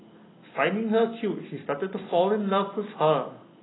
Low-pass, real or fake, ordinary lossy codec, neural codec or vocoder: 7.2 kHz; real; AAC, 16 kbps; none